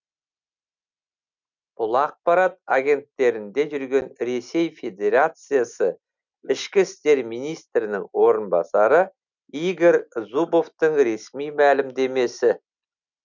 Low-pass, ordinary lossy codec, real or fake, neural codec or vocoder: 7.2 kHz; none; real; none